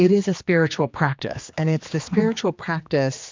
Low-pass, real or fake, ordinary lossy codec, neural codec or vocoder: 7.2 kHz; fake; MP3, 64 kbps; codec, 16 kHz, 2 kbps, X-Codec, HuBERT features, trained on general audio